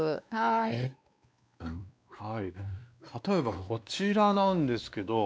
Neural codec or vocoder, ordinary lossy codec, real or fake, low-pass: codec, 16 kHz, 2 kbps, X-Codec, WavLM features, trained on Multilingual LibriSpeech; none; fake; none